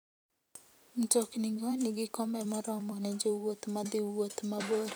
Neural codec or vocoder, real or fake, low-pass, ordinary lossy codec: vocoder, 44.1 kHz, 128 mel bands every 256 samples, BigVGAN v2; fake; none; none